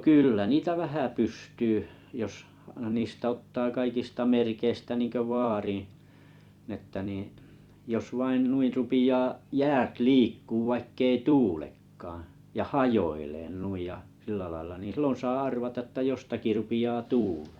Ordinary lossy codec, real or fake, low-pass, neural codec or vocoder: Opus, 64 kbps; fake; 19.8 kHz; vocoder, 44.1 kHz, 128 mel bands every 256 samples, BigVGAN v2